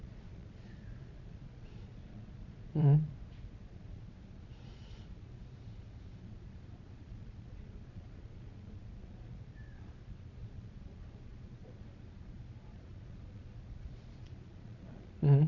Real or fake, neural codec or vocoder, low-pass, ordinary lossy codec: fake; vocoder, 22.05 kHz, 80 mel bands, Vocos; 7.2 kHz; none